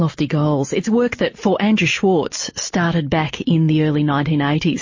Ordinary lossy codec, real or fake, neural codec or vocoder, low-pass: MP3, 32 kbps; real; none; 7.2 kHz